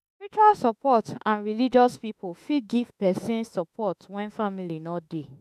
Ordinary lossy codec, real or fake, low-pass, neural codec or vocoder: none; fake; 14.4 kHz; autoencoder, 48 kHz, 32 numbers a frame, DAC-VAE, trained on Japanese speech